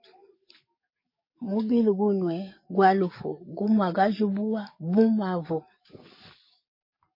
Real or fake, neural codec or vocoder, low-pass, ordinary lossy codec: fake; codec, 44.1 kHz, 7.8 kbps, DAC; 5.4 kHz; MP3, 24 kbps